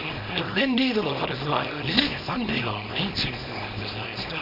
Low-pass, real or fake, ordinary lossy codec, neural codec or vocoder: 5.4 kHz; fake; none; codec, 24 kHz, 0.9 kbps, WavTokenizer, small release